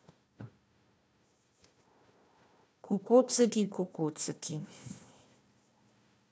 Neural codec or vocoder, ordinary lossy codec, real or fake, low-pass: codec, 16 kHz, 1 kbps, FunCodec, trained on Chinese and English, 50 frames a second; none; fake; none